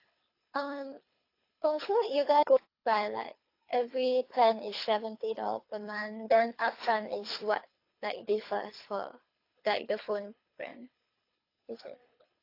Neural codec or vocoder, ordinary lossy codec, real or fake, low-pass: codec, 24 kHz, 3 kbps, HILCodec; AAC, 32 kbps; fake; 5.4 kHz